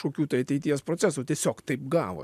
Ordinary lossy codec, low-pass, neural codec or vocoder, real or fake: MP3, 96 kbps; 14.4 kHz; none; real